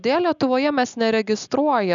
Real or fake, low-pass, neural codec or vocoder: real; 7.2 kHz; none